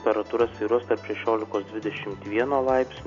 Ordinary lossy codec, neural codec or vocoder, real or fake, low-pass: AAC, 96 kbps; none; real; 7.2 kHz